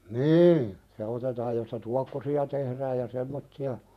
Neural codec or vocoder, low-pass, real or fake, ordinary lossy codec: vocoder, 48 kHz, 128 mel bands, Vocos; 14.4 kHz; fake; none